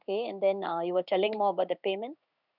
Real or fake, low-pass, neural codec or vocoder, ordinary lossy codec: fake; 5.4 kHz; codec, 16 kHz in and 24 kHz out, 1 kbps, XY-Tokenizer; none